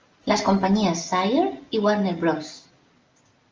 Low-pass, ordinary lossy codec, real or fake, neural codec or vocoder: 7.2 kHz; Opus, 24 kbps; real; none